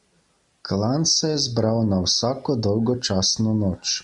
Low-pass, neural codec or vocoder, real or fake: 10.8 kHz; none; real